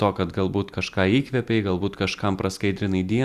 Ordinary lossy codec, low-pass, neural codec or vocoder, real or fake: Opus, 64 kbps; 14.4 kHz; none; real